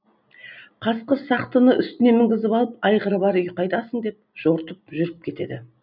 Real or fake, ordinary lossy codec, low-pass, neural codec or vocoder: real; none; 5.4 kHz; none